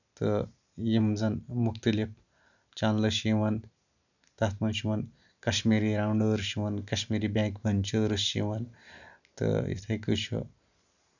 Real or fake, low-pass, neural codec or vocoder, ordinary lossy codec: real; 7.2 kHz; none; none